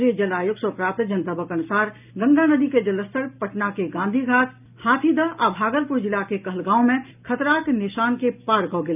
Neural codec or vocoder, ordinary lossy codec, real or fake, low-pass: none; none; real; 3.6 kHz